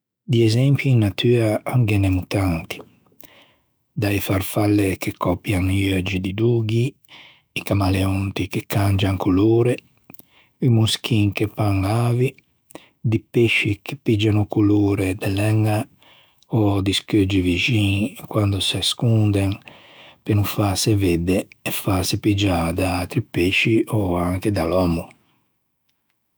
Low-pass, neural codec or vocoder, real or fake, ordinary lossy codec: none; autoencoder, 48 kHz, 128 numbers a frame, DAC-VAE, trained on Japanese speech; fake; none